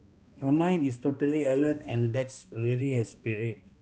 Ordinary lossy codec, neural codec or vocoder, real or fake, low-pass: none; codec, 16 kHz, 1 kbps, X-Codec, HuBERT features, trained on balanced general audio; fake; none